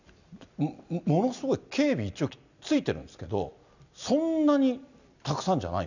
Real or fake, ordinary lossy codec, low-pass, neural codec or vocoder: real; none; 7.2 kHz; none